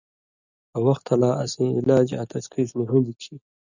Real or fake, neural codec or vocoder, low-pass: real; none; 7.2 kHz